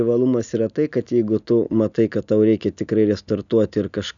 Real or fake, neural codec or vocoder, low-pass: real; none; 7.2 kHz